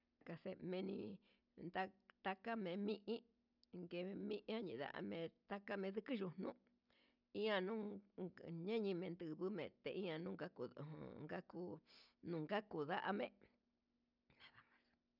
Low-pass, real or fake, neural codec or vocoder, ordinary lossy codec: 5.4 kHz; real; none; none